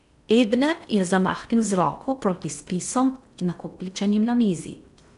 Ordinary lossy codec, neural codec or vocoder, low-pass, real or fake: none; codec, 16 kHz in and 24 kHz out, 0.6 kbps, FocalCodec, streaming, 4096 codes; 10.8 kHz; fake